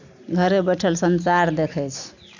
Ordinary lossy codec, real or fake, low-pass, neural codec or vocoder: none; real; 7.2 kHz; none